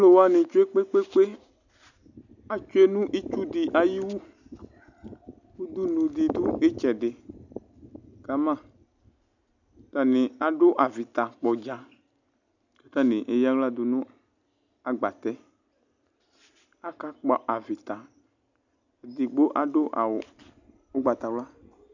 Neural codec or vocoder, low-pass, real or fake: none; 7.2 kHz; real